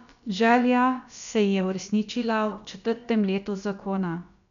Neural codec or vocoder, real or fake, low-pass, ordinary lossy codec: codec, 16 kHz, about 1 kbps, DyCAST, with the encoder's durations; fake; 7.2 kHz; none